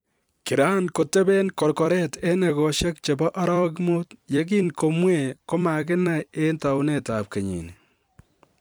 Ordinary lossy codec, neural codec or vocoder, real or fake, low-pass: none; vocoder, 44.1 kHz, 128 mel bands every 256 samples, BigVGAN v2; fake; none